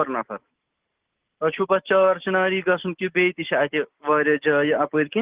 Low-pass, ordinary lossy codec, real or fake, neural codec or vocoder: 3.6 kHz; Opus, 16 kbps; real; none